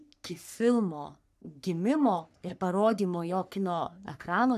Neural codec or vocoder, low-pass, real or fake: codec, 44.1 kHz, 3.4 kbps, Pupu-Codec; 14.4 kHz; fake